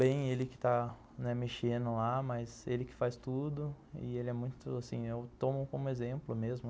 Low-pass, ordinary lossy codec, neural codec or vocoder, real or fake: none; none; none; real